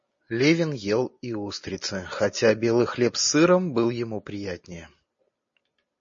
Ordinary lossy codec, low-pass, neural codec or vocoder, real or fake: MP3, 32 kbps; 7.2 kHz; none; real